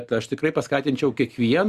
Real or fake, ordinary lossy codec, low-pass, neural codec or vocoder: real; Opus, 64 kbps; 14.4 kHz; none